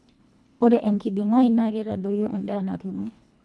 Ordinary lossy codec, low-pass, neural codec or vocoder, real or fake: none; none; codec, 24 kHz, 1.5 kbps, HILCodec; fake